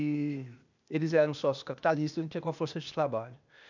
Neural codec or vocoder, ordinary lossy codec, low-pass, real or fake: codec, 16 kHz, 0.8 kbps, ZipCodec; none; 7.2 kHz; fake